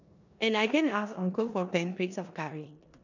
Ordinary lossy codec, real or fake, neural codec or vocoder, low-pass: none; fake; codec, 16 kHz in and 24 kHz out, 0.9 kbps, LongCat-Audio-Codec, four codebook decoder; 7.2 kHz